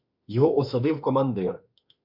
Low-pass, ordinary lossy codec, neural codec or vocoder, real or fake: 5.4 kHz; MP3, 48 kbps; autoencoder, 48 kHz, 32 numbers a frame, DAC-VAE, trained on Japanese speech; fake